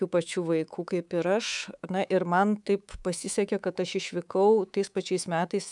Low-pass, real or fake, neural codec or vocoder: 10.8 kHz; fake; codec, 24 kHz, 3.1 kbps, DualCodec